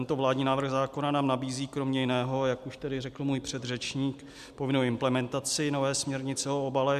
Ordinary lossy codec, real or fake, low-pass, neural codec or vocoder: MP3, 96 kbps; real; 14.4 kHz; none